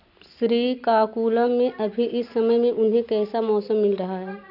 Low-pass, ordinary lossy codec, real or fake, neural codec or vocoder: 5.4 kHz; AAC, 48 kbps; real; none